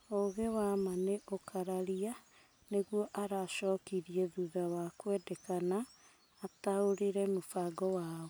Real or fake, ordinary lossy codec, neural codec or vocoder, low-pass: real; none; none; none